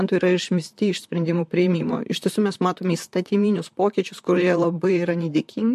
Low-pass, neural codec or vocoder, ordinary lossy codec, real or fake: 14.4 kHz; vocoder, 44.1 kHz, 128 mel bands, Pupu-Vocoder; MP3, 64 kbps; fake